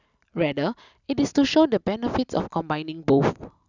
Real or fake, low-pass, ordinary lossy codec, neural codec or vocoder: real; 7.2 kHz; none; none